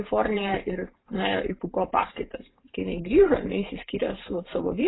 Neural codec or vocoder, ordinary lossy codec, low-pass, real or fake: vocoder, 44.1 kHz, 128 mel bands every 512 samples, BigVGAN v2; AAC, 16 kbps; 7.2 kHz; fake